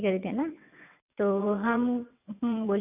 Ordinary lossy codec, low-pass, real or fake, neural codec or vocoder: none; 3.6 kHz; fake; vocoder, 22.05 kHz, 80 mel bands, WaveNeXt